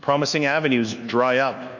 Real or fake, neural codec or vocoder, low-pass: fake; codec, 24 kHz, 1.2 kbps, DualCodec; 7.2 kHz